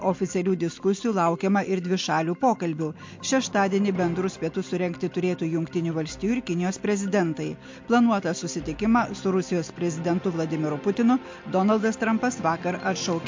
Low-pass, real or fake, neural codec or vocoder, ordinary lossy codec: 7.2 kHz; real; none; MP3, 48 kbps